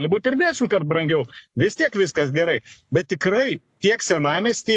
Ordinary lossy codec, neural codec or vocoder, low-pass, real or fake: AAC, 64 kbps; codec, 44.1 kHz, 3.4 kbps, Pupu-Codec; 10.8 kHz; fake